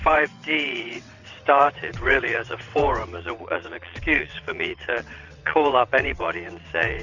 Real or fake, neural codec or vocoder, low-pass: fake; vocoder, 22.05 kHz, 80 mel bands, WaveNeXt; 7.2 kHz